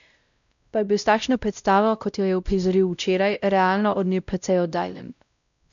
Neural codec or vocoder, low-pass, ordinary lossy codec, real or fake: codec, 16 kHz, 0.5 kbps, X-Codec, WavLM features, trained on Multilingual LibriSpeech; 7.2 kHz; none; fake